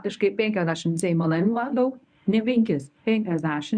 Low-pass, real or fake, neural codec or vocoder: 9.9 kHz; fake; codec, 24 kHz, 0.9 kbps, WavTokenizer, medium speech release version 1